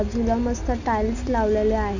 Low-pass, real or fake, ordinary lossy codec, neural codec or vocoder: 7.2 kHz; real; none; none